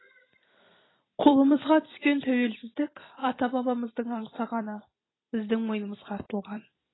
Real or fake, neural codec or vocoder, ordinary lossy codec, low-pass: fake; vocoder, 44.1 kHz, 128 mel bands every 256 samples, BigVGAN v2; AAC, 16 kbps; 7.2 kHz